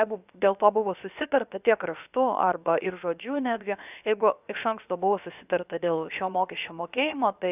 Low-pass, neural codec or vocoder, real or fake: 3.6 kHz; codec, 16 kHz, about 1 kbps, DyCAST, with the encoder's durations; fake